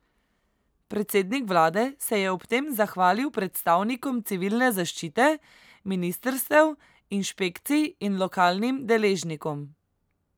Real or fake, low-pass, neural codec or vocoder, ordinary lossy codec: real; none; none; none